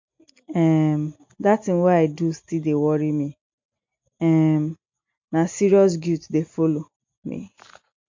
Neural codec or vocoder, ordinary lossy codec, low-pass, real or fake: none; MP3, 48 kbps; 7.2 kHz; real